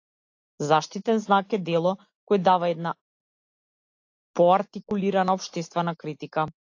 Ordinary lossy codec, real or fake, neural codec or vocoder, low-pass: AAC, 48 kbps; real; none; 7.2 kHz